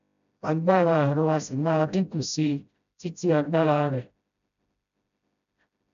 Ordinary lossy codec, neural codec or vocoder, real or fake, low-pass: none; codec, 16 kHz, 0.5 kbps, FreqCodec, smaller model; fake; 7.2 kHz